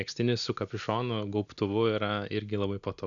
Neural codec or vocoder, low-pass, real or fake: none; 7.2 kHz; real